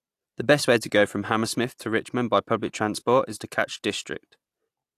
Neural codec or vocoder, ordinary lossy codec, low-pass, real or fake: none; AAC, 64 kbps; 14.4 kHz; real